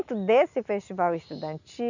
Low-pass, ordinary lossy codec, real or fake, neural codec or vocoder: 7.2 kHz; none; real; none